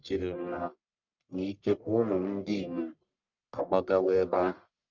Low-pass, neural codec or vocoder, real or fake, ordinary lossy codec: 7.2 kHz; codec, 44.1 kHz, 1.7 kbps, Pupu-Codec; fake; none